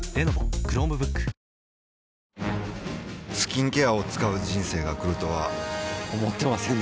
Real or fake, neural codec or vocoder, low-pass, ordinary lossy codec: real; none; none; none